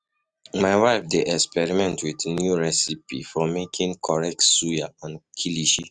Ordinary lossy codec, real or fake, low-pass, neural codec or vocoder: none; real; none; none